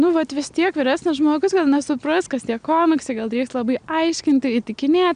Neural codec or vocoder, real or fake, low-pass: none; real; 9.9 kHz